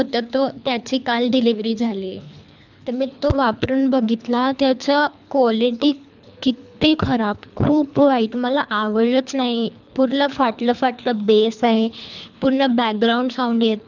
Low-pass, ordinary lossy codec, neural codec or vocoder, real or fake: 7.2 kHz; none; codec, 24 kHz, 3 kbps, HILCodec; fake